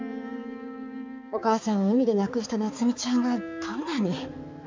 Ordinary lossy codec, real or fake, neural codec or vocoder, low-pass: MP3, 64 kbps; fake; codec, 16 kHz, 4 kbps, X-Codec, HuBERT features, trained on balanced general audio; 7.2 kHz